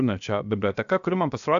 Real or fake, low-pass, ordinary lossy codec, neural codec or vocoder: fake; 7.2 kHz; MP3, 96 kbps; codec, 16 kHz, 0.7 kbps, FocalCodec